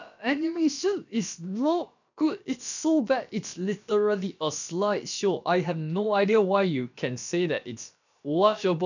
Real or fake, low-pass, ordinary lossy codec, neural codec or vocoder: fake; 7.2 kHz; none; codec, 16 kHz, about 1 kbps, DyCAST, with the encoder's durations